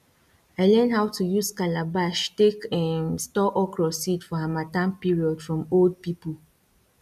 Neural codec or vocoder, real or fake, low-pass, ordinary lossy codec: none; real; 14.4 kHz; none